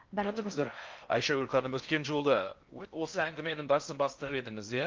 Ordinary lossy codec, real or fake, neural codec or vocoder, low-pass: Opus, 24 kbps; fake; codec, 16 kHz in and 24 kHz out, 0.6 kbps, FocalCodec, streaming, 4096 codes; 7.2 kHz